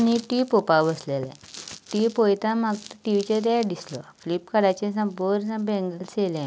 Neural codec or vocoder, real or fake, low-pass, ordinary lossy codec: none; real; none; none